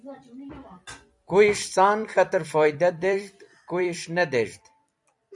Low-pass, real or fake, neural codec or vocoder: 10.8 kHz; fake; vocoder, 44.1 kHz, 128 mel bands every 256 samples, BigVGAN v2